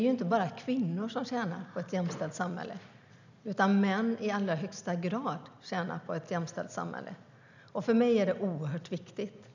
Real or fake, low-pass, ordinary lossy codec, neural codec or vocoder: real; 7.2 kHz; none; none